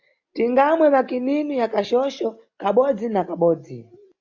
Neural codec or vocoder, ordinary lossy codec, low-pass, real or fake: none; AAC, 48 kbps; 7.2 kHz; real